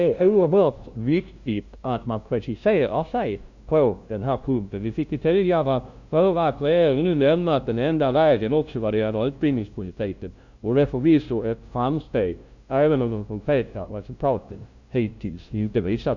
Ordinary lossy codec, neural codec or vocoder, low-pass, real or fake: none; codec, 16 kHz, 0.5 kbps, FunCodec, trained on LibriTTS, 25 frames a second; 7.2 kHz; fake